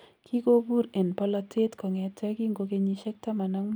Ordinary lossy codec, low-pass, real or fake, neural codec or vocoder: none; none; real; none